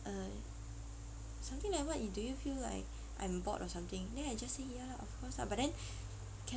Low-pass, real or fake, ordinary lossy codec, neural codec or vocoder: none; real; none; none